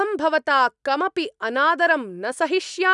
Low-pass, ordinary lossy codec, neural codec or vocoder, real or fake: 10.8 kHz; none; none; real